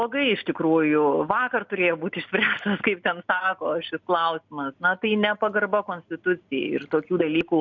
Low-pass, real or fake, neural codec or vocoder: 7.2 kHz; real; none